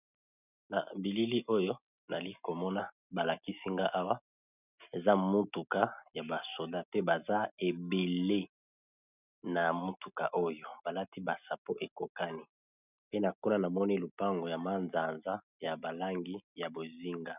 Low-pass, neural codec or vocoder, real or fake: 3.6 kHz; none; real